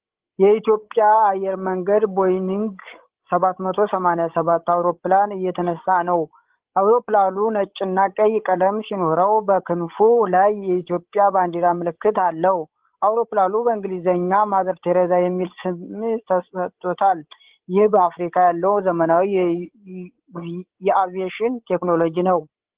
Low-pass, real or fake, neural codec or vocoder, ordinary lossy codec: 3.6 kHz; fake; codec, 16 kHz, 16 kbps, FreqCodec, larger model; Opus, 16 kbps